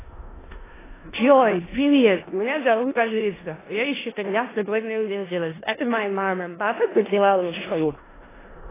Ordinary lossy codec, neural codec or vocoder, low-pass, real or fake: AAC, 16 kbps; codec, 16 kHz in and 24 kHz out, 0.4 kbps, LongCat-Audio-Codec, four codebook decoder; 3.6 kHz; fake